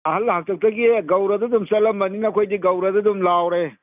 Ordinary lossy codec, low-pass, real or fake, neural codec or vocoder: none; 3.6 kHz; real; none